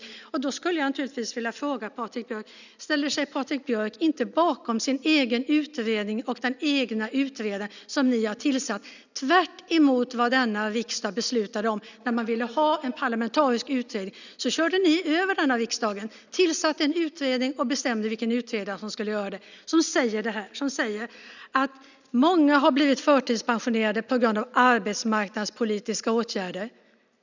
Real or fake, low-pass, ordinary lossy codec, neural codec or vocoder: real; 7.2 kHz; none; none